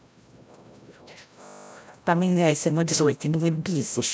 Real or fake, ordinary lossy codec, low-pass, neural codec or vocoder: fake; none; none; codec, 16 kHz, 0.5 kbps, FreqCodec, larger model